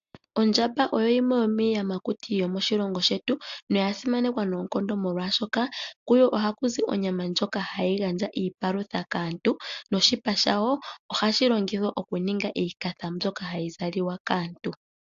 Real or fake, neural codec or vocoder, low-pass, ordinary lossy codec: real; none; 7.2 kHz; AAC, 64 kbps